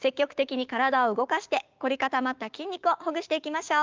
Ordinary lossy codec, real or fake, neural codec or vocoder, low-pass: Opus, 24 kbps; fake; codec, 16 kHz, 6 kbps, DAC; 7.2 kHz